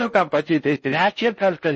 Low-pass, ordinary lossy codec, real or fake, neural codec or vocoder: 10.8 kHz; MP3, 32 kbps; fake; codec, 16 kHz in and 24 kHz out, 0.6 kbps, FocalCodec, streaming, 4096 codes